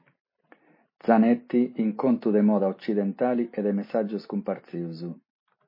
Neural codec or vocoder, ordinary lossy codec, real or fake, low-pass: none; MP3, 24 kbps; real; 5.4 kHz